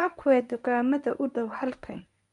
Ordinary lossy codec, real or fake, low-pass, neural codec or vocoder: none; fake; 10.8 kHz; codec, 24 kHz, 0.9 kbps, WavTokenizer, medium speech release version 1